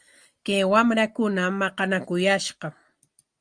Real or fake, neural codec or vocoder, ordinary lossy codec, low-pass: real; none; Opus, 32 kbps; 9.9 kHz